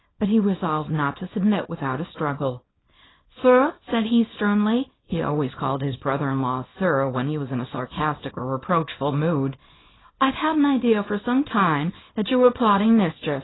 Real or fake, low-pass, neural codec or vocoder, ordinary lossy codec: fake; 7.2 kHz; codec, 24 kHz, 0.9 kbps, WavTokenizer, small release; AAC, 16 kbps